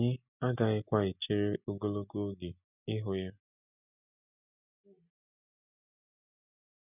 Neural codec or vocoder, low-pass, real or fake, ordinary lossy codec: none; 3.6 kHz; real; none